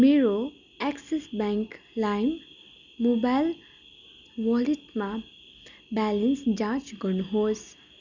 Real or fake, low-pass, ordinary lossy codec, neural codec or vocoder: real; 7.2 kHz; none; none